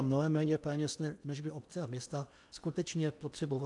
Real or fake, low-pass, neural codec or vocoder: fake; 10.8 kHz; codec, 16 kHz in and 24 kHz out, 0.8 kbps, FocalCodec, streaming, 65536 codes